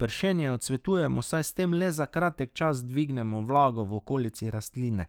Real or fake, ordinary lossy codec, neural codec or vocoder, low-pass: fake; none; codec, 44.1 kHz, 7.8 kbps, DAC; none